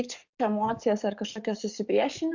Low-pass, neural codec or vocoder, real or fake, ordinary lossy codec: 7.2 kHz; codec, 44.1 kHz, 7.8 kbps, DAC; fake; Opus, 64 kbps